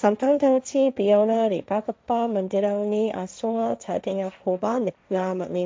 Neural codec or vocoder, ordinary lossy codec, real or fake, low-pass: codec, 16 kHz, 1.1 kbps, Voila-Tokenizer; none; fake; none